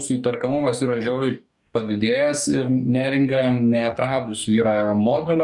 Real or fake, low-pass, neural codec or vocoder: fake; 10.8 kHz; codec, 44.1 kHz, 2.6 kbps, DAC